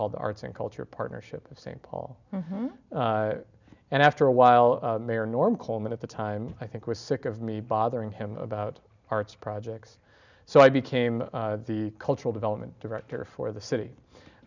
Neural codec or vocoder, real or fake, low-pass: none; real; 7.2 kHz